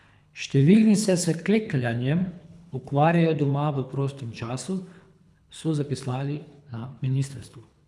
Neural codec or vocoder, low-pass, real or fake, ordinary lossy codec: codec, 24 kHz, 3 kbps, HILCodec; none; fake; none